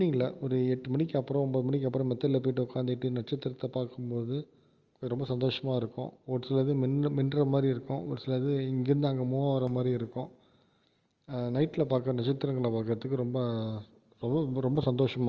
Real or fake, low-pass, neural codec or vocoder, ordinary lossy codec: real; 7.2 kHz; none; Opus, 24 kbps